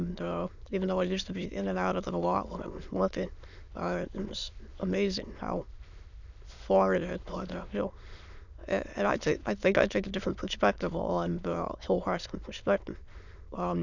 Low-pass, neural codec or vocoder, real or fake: 7.2 kHz; autoencoder, 22.05 kHz, a latent of 192 numbers a frame, VITS, trained on many speakers; fake